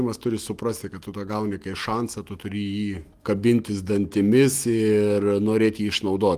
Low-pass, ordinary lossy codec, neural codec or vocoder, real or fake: 14.4 kHz; Opus, 24 kbps; autoencoder, 48 kHz, 128 numbers a frame, DAC-VAE, trained on Japanese speech; fake